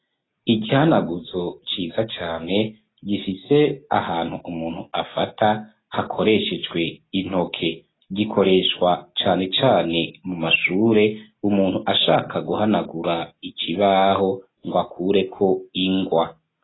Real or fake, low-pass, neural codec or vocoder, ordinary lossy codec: real; 7.2 kHz; none; AAC, 16 kbps